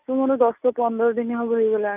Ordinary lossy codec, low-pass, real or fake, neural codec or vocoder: none; 3.6 kHz; real; none